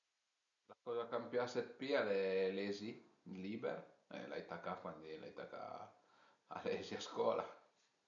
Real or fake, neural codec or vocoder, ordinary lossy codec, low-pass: real; none; none; 7.2 kHz